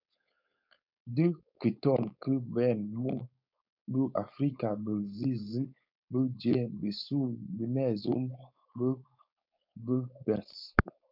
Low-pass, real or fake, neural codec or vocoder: 5.4 kHz; fake; codec, 16 kHz, 4.8 kbps, FACodec